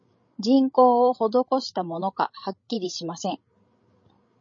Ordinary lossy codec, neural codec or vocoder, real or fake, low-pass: MP3, 32 kbps; codec, 16 kHz, 16 kbps, FreqCodec, larger model; fake; 7.2 kHz